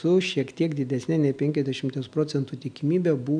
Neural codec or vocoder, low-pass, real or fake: none; 9.9 kHz; real